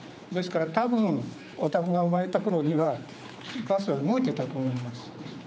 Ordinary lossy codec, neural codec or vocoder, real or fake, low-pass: none; codec, 16 kHz, 4 kbps, X-Codec, HuBERT features, trained on general audio; fake; none